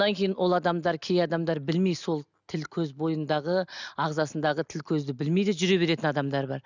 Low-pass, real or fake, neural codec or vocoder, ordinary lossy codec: 7.2 kHz; real; none; none